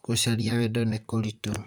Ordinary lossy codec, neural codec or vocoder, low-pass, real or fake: none; vocoder, 44.1 kHz, 128 mel bands, Pupu-Vocoder; none; fake